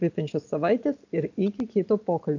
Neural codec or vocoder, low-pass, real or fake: none; 7.2 kHz; real